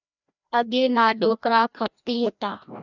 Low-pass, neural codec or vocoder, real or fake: 7.2 kHz; codec, 16 kHz, 1 kbps, FreqCodec, larger model; fake